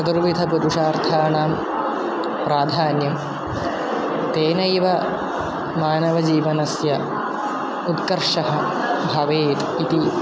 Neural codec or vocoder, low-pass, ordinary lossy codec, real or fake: none; none; none; real